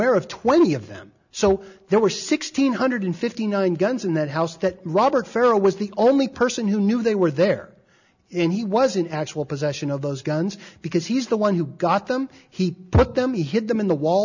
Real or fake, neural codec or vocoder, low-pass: real; none; 7.2 kHz